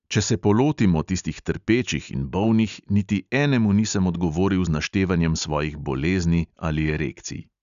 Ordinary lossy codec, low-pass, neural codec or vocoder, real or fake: none; 7.2 kHz; none; real